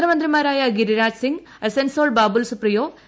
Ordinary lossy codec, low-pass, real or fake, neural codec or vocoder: none; none; real; none